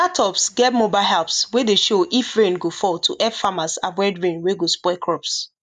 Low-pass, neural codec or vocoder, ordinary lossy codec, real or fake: 10.8 kHz; none; none; real